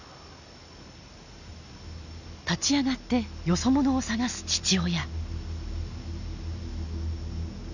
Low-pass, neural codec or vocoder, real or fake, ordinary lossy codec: 7.2 kHz; none; real; none